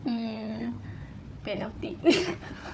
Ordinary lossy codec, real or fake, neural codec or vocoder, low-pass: none; fake; codec, 16 kHz, 4 kbps, FunCodec, trained on Chinese and English, 50 frames a second; none